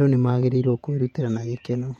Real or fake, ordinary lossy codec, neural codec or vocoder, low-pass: fake; MP3, 64 kbps; vocoder, 48 kHz, 128 mel bands, Vocos; 19.8 kHz